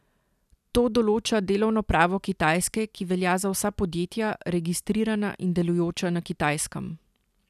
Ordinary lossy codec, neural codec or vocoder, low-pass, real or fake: none; none; 14.4 kHz; real